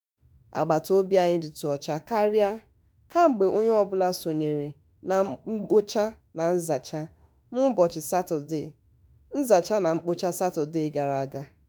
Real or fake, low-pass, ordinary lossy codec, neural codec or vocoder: fake; none; none; autoencoder, 48 kHz, 32 numbers a frame, DAC-VAE, trained on Japanese speech